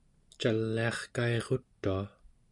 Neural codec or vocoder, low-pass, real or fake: none; 10.8 kHz; real